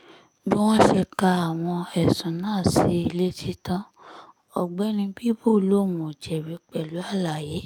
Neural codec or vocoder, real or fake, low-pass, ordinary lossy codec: codec, 44.1 kHz, 7.8 kbps, DAC; fake; 19.8 kHz; none